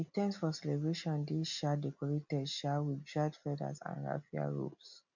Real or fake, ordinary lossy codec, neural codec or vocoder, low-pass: real; none; none; 7.2 kHz